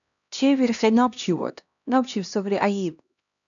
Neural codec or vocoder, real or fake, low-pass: codec, 16 kHz, 1 kbps, X-Codec, HuBERT features, trained on LibriSpeech; fake; 7.2 kHz